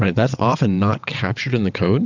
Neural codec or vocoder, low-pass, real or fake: vocoder, 22.05 kHz, 80 mel bands, WaveNeXt; 7.2 kHz; fake